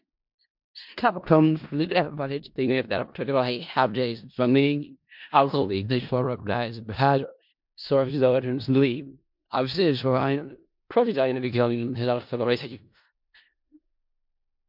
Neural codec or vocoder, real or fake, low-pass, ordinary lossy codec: codec, 16 kHz in and 24 kHz out, 0.4 kbps, LongCat-Audio-Codec, four codebook decoder; fake; 5.4 kHz; MP3, 48 kbps